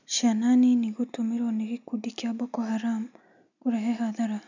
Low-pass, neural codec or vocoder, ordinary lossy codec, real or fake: 7.2 kHz; none; none; real